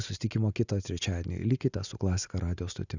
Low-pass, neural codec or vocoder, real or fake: 7.2 kHz; none; real